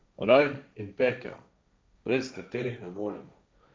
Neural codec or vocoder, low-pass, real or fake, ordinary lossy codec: codec, 16 kHz, 1.1 kbps, Voila-Tokenizer; none; fake; none